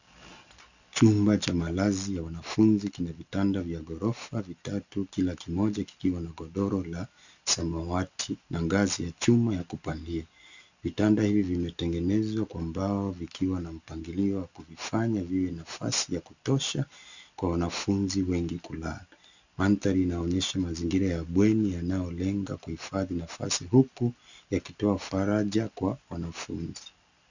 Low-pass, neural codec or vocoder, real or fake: 7.2 kHz; none; real